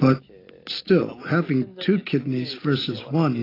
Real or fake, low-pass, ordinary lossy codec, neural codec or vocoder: real; 5.4 kHz; AAC, 24 kbps; none